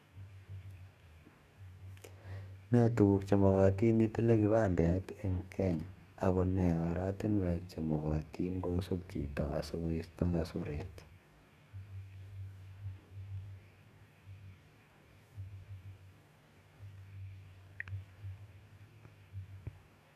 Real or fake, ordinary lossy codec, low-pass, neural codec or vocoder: fake; none; 14.4 kHz; codec, 44.1 kHz, 2.6 kbps, DAC